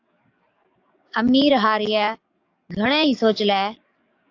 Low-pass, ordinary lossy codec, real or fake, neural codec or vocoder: 7.2 kHz; AAC, 48 kbps; fake; codec, 44.1 kHz, 7.8 kbps, DAC